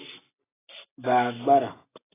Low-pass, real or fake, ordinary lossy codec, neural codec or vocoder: 3.6 kHz; real; AAC, 16 kbps; none